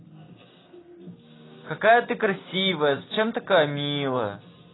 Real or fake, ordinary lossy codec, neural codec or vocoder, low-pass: real; AAC, 16 kbps; none; 7.2 kHz